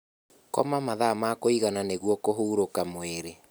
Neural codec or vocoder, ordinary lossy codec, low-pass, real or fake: none; none; none; real